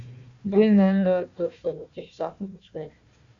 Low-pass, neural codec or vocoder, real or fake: 7.2 kHz; codec, 16 kHz, 1 kbps, FunCodec, trained on Chinese and English, 50 frames a second; fake